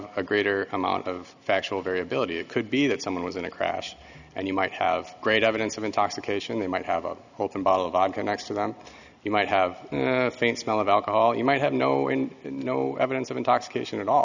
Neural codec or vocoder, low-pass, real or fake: none; 7.2 kHz; real